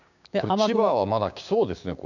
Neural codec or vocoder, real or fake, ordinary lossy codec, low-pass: codec, 16 kHz, 6 kbps, DAC; fake; none; 7.2 kHz